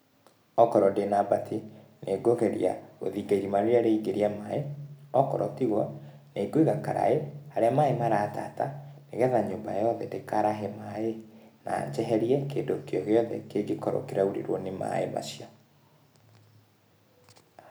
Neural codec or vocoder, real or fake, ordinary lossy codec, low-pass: none; real; none; none